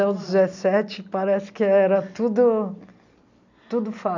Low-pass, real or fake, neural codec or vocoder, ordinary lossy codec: 7.2 kHz; real; none; none